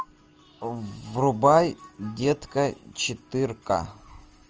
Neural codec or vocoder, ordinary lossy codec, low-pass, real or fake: none; Opus, 24 kbps; 7.2 kHz; real